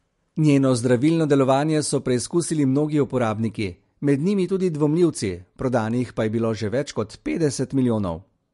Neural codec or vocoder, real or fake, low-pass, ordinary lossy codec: none; real; 14.4 kHz; MP3, 48 kbps